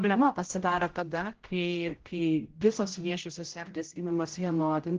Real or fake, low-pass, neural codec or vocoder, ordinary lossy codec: fake; 7.2 kHz; codec, 16 kHz, 0.5 kbps, X-Codec, HuBERT features, trained on general audio; Opus, 16 kbps